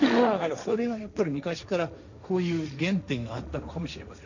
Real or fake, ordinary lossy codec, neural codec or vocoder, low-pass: fake; none; codec, 16 kHz, 1.1 kbps, Voila-Tokenizer; 7.2 kHz